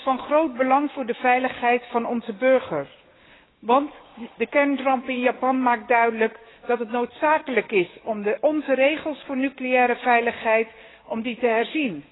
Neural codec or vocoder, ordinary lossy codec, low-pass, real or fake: codec, 16 kHz, 6 kbps, DAC; AAC, 16 kbps; 7.2 kHz; fake